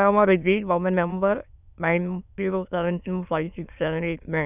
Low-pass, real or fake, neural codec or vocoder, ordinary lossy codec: 3.6 kHz; fake; autoencoder, 22.05 kHz, a latent of 192 numbers a frame, VITS, trained on many speakers; none